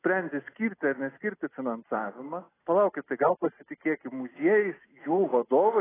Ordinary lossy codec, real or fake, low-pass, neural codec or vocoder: AAC, 16 kbps; real; 3.6 kHz; none